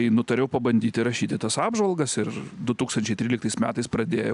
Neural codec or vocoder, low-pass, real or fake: none; 10.8 kHz; real